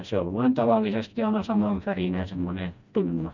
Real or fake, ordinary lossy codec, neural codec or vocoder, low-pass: fake; none; codec, 16 kHz, 1 kbps, FreqCodec, smaller model; 7.2 kHz